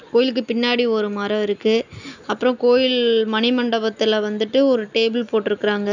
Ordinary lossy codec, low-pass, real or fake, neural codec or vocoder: none; 7.2 kHz; real; none